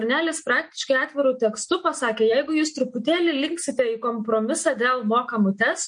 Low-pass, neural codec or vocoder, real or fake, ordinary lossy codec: 9.9 kHz; none; real; MP3, 48 kbps